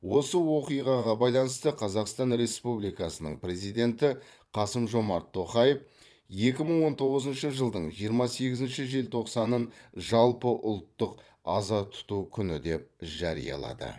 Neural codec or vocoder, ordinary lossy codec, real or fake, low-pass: vocoder, 22.05 kHz, 80 mel bands, Vocos; none; fake; none